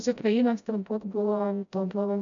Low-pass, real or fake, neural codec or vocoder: 7.2 kHz; fake; codec, 16 kHz, 0.5 kbps, FreqCodec, smaller model